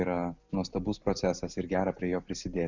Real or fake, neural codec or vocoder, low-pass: real; none; 7.2 kHz